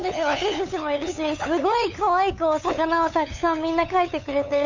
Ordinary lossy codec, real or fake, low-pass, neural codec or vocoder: none; fake; 7.2 kHz; codec, 16 kHz, 8 kbps, FunCodec, trained on LibriTTS, 25 frames a second